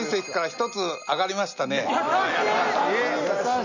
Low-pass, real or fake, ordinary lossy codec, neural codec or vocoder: 7.2 kHz; real; none; none